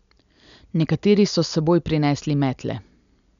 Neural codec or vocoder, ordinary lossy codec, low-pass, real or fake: none; none; 7.2 kHz; real